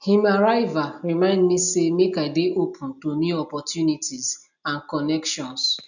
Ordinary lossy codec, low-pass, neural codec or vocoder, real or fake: none; 7.2 kHz; none; real